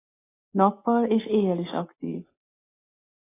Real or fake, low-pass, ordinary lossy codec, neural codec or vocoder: real; 3.6 kHz; AAC, 16 kbps; none